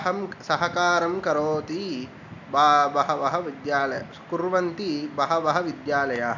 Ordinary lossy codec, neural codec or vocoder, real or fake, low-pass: none; none; real; 7.2 kHz